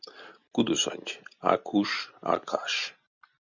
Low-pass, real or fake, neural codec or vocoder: 7.2 kHz; real; none